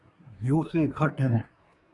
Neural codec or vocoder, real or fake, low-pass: codec, 24 kHz, 1 kbps, SNAC; fake; 10.8 kHz